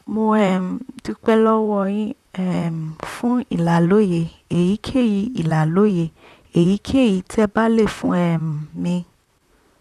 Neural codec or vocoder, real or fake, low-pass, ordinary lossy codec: vocoder, 44.1 kHz, 128 mel bands, Pupu-Vocoder; fake; 14.4 kHz; none